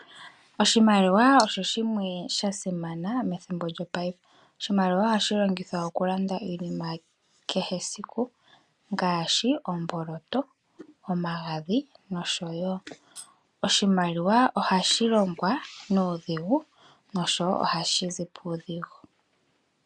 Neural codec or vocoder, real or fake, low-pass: none; real; 10.8 kHz